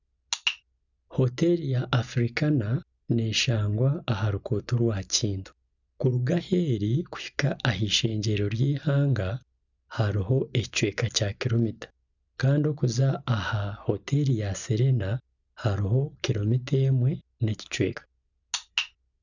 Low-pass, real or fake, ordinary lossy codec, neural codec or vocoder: 7.2 kHz; real; none; none